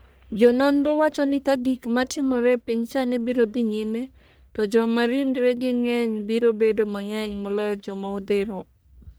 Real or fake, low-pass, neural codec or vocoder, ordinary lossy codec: fake; none; codec, 44.1 kHz, 1.7 kbps, Pupu-Codec; none